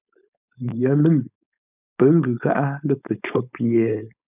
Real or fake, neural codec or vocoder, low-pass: fake; codec, 16 kHz, 4.8 kbps, FACodec; 3.6 kHz